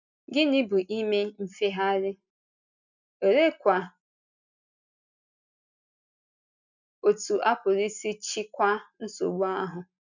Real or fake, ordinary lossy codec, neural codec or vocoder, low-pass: real; none; none; 7.2 kHz